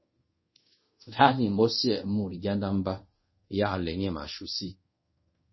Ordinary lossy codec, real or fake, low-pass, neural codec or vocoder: MP3, 24 kbps; fake; 7.2 kHz; codec, 24 kHz, 0.5 kbps, DualCodec